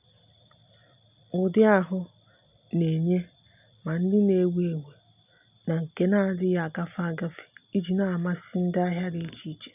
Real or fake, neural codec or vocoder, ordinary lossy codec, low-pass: real; none; none; 3.6 kHz